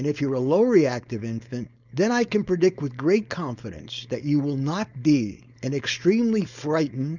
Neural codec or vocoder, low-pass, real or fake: codec, 16 kHz, 4.8 kbps, FACodec; 7.2 kHz; fake